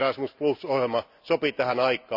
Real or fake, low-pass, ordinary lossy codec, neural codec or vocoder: real; 5.4 kHz; none; none